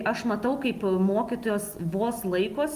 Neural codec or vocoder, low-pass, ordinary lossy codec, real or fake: none; 14.4 kHz; Opus, 32 kbps; real